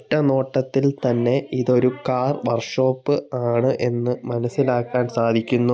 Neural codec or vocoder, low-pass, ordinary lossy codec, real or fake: none; none; none; real